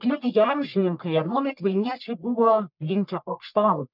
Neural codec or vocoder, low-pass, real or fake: codec, 44.1 kHz, 1.7 kbps, Pupu-Codec; 5.4 kHz; fake